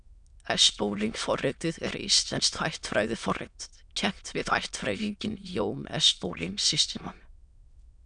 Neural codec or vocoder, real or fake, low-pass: autoencoder, 22.05 kHz, a latent of 192 numbers a frame, VITS, trained on many speakers; fake; 9.9 kHz